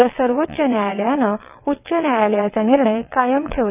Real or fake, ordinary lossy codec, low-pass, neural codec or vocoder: fake; none; 3.6 kHz; vocoder, 22.05 kHz, 80 mel bands, WaveNeXt